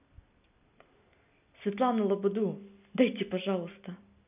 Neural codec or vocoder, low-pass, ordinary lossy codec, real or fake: none; 3.6 kHz; none; real